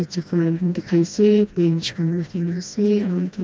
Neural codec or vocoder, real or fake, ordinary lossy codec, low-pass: codec, 16 kHz, 1 kbps, FreqCodec, smaller model; fake; none; none